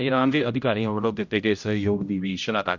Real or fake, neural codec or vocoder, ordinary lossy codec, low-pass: fake; codec, 16 kHz, 0.5 kbps, X-Codec, HuBERT features, trained on general audio; none; 7.2 kHz